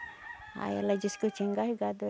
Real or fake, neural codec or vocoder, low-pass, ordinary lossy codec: real; none; none; none